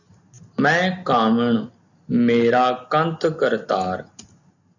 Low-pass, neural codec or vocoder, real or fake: 7.2 kHz; none; real